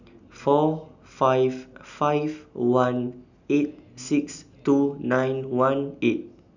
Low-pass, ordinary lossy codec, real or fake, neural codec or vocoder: 7.2 kHz; none; real; none